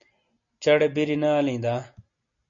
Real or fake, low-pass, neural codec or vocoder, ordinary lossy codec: real; 7.2 kHz; none; MP3, 48 kbps